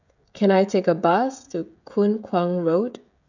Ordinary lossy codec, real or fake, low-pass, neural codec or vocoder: none; fake; 7.2 kHz; codec, 16 kHz, 16 kbps, FreqCodec, smaller model